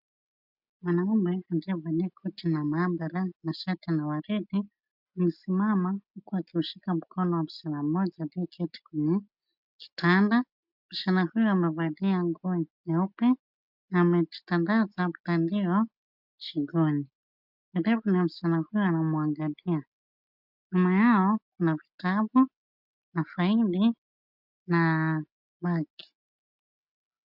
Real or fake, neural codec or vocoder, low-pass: real; none; 5.4 kHz